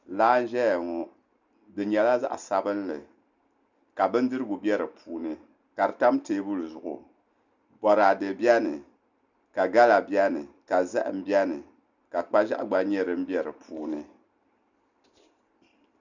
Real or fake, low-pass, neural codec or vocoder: real; 7.2 kHz; none